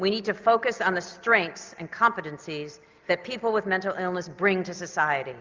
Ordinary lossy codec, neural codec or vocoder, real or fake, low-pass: Opus, 32 kbps; none; real; 7.2 kHz